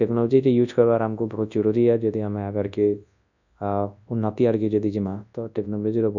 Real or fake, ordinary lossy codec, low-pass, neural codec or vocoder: fake; none; 7.2 kHz; codec, 24 kHz, 0.9 kbps, WavTokenizer, large speech release